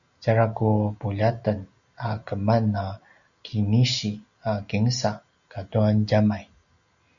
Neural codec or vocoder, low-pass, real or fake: none; 7.2 kHz; real